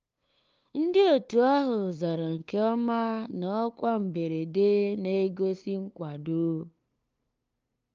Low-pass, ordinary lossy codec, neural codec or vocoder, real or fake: 7.2 kHz; Opus, 24 kbps; codec, 16 kHz, 2 kbps, FunCodec, trained on LibriTTS, 25 frames a second; fake